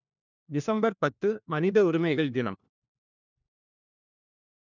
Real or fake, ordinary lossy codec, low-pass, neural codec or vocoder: fake; none; 7.2 kHz; codec, 16 kHz, 1 kbps, FunCodec, trained on LibriTTS, 50 frames a second